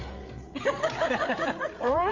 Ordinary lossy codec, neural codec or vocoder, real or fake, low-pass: MP3, 48 kbps; codec, 16 kHz, 8 kbps, FreqCodec, larger model; fake; 7.2 kHz